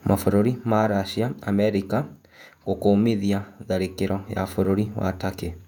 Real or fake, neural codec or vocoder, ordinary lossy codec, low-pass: fake; vocoder, 48 kHz, 128 mel bands, Vocos; none; 19.8 kHz